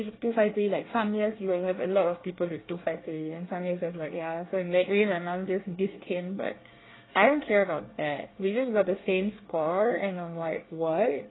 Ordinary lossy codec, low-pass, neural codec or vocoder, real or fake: AAC, 16 kbps; 7.2 kHz; codec, 24 kHz, 1 kbps, SNAC; fake